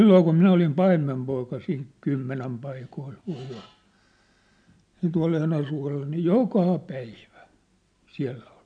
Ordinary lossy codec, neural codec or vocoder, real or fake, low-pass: none; none; real; 9.9 kHz